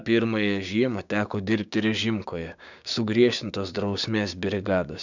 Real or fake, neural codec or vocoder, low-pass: fake; codec, 16 kHz, 6 kbps, DAC; 7.2 kHz